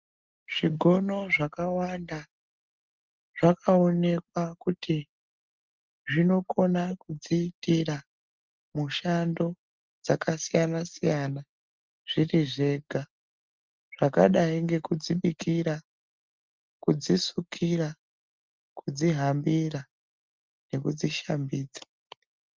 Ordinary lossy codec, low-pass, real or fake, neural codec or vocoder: Opus, 24 kbps; 7.2 kHz; real; none